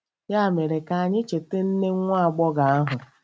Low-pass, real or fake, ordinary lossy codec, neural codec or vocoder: none; real; none; none